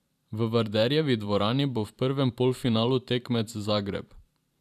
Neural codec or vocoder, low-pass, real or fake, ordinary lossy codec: vocoder, 44.1 kHz, 128 mel bands every 512 samples, BigVGAN v2; 14.4 kHz; fake; none